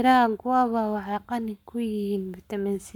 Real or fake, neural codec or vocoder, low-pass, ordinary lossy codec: fake; codec, 44.1 kHz, 7.8 kbps, Pupu-Codec; 19.8 kHz; none